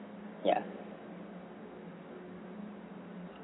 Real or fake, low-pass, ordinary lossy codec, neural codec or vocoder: real; 7.2 kHz; AAC, 16 kbps; none